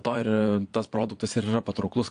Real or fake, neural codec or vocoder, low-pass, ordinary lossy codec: fake; vocoder, 22.05 kHz, 80 mel bands, Vocos; 9.9 kHz; AAC, 64 kbps